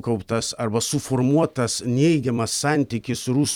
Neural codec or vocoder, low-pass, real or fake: vocoder, 44.1 kHz, 128 mel bands every 256 samples, BigVGAN v2; 19.8 kHz; fake